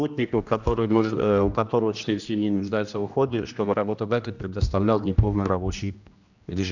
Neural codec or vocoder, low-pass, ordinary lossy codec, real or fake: codec, 16 kHz, 1 kbps, X-Codec, HuBERT features, trained on general audio; 7.2 kHz; none; fake